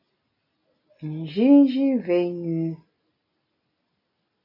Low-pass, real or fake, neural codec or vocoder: 5.4 kHz; real; none